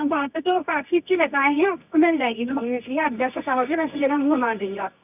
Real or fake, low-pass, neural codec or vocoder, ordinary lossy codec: fake; 3.6 kHz; codec, 24 kHz, 0.9 kbps, WavTokenizer, medium music audio release; none